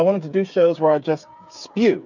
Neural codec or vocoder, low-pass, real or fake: codec, 16 kHz, 8 kbps, FreqCodec, smaller model; 7.2 kHz; fake